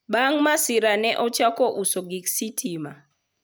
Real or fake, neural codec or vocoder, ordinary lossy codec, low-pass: real; none; none; none